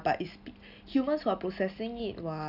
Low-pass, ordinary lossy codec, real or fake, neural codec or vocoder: 5.4 kHz; none; real; none